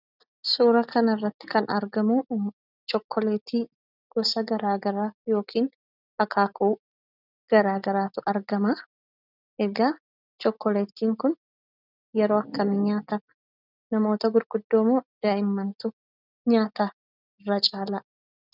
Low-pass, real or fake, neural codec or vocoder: 5.4 kHz; real; none